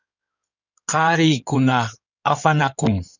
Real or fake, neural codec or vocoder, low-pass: fake; codec, 16 kHz in and 24 kHz out, 2.2 kbps, FireRedTTS-2 codec; 7.2 kHz